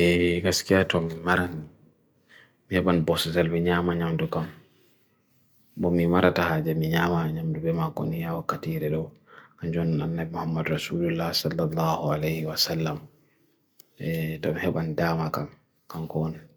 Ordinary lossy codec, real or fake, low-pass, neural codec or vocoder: none; real; none; none